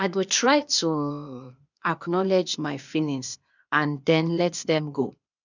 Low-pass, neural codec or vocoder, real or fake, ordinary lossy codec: 7.2 kHz; codec, 16 kHz, 0.8 kbps, ZipCodec; fake; none